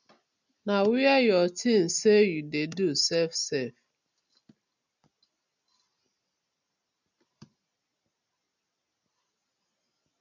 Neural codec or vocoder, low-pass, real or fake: none; 7.2 kHz; real